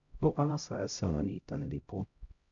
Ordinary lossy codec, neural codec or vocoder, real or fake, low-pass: Opus, 64 kbps; codec, 16 kHz, 0.5 kbps, X-Codec, HuBERT features, trained on LibriSpeech; fake; 7.2 kHz